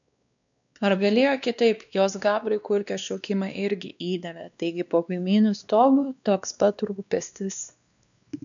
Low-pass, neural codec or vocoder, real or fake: 7.2 kHz; codec, 16 kHz, 2 kbps, X-Codec, WavLM features, trained on Multilingual LibriSpeech; fake